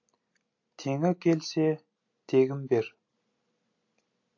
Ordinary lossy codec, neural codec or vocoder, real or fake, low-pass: AAC, 48 kbps; none; real; 7.2 kHz